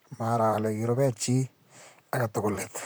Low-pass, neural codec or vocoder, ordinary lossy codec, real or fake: none; vocoder, 44.1 kHz, 128 mel bands, Pupu-Vocoder; none; fake